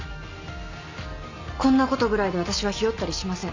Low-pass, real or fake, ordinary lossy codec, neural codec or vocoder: 7.2 kHz; real; MP3, 48 kbps; none